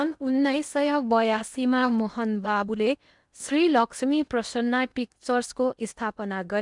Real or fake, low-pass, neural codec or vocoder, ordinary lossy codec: fake; 10.8 kHz; codec, 16 kHz in and 24 kHz out, 0.8 kbps, FocalCodec, streaming, 65536 codes; none